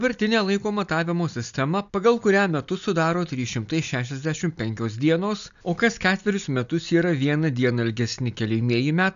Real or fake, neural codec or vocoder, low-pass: real; none; 7.2 kHz